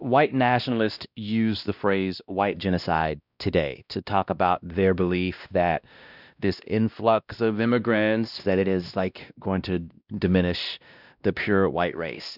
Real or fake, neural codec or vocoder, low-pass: fake; codec, 16 kHz, 1 kbps, X-Codec, WavLM features, trained on Multilingual LibriSpeech; 5.4 kHz